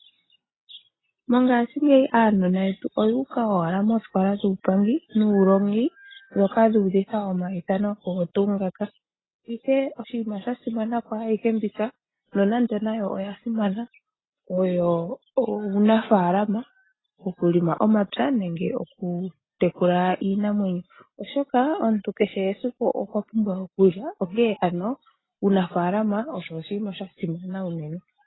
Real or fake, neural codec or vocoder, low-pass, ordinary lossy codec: real; none; 7.2 kHz; AAC, 16 kbps